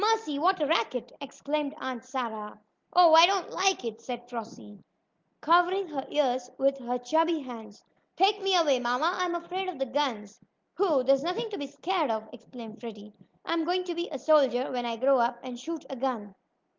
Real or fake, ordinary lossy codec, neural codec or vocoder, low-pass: real; Opus, 32 kbps; none; 7.2 kHz